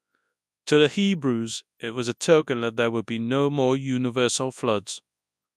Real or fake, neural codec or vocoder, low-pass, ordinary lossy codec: fake; codec, 24 kHz, 0.9 kbps, WavTokenizer, large speech release; none; none